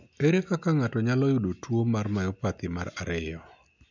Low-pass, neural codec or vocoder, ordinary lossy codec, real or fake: 7.2 kHz; none; none; real